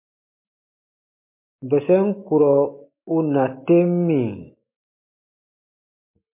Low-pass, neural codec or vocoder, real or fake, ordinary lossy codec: 3.6 kHz; none; real; MP3, 24 kbps